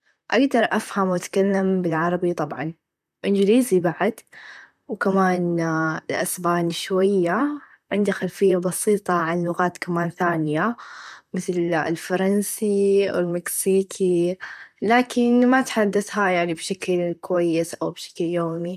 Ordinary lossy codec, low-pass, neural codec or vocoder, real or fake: none; 14.4 kHz; vocoder, 44.1 kHz, 128 mel bands, Pupu-Vocoder; fake